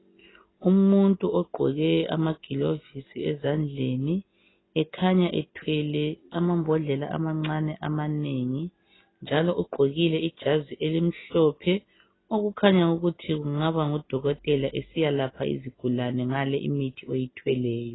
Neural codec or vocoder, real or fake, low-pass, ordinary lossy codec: none; real; 7.2 kHz; AAC, 16 kbps